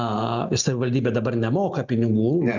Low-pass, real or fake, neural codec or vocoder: 7.2 kHz; real; none